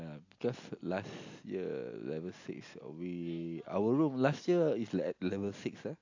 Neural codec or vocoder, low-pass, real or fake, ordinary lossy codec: none; 7.2 kHz; real; AAC, 48 kbps